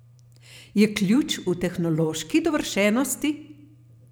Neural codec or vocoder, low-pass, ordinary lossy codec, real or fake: vocoder, 44.1 kHz, 128 mel bands every 512 samples, BigVGAN v2; none; none; fake